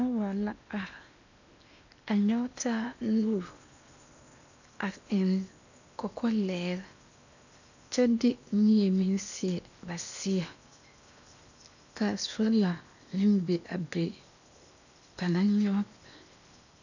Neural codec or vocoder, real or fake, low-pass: codec, 16 kHz in and 24 kHz out, 0.8 kbps, FocalCodec, streaming, 65536 codes; fake; 7.2 kHz